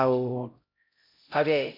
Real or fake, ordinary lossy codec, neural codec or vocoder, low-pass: fake; MP3, 32 kbps; codec, 16 kHz, 0.5 kbps, X-Codec, HuBERT features, trained on LibriSpeech; 5.4 kHz